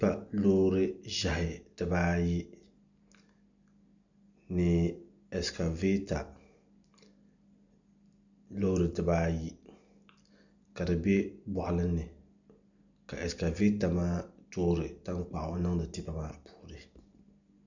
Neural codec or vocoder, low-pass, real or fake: none; 7.2 kHz; real